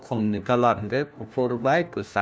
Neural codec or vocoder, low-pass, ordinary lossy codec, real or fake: codec, 16 kHz, 1 kbps, FunCodec, trained on LibriTTS, 50 frames a second; none; none; fake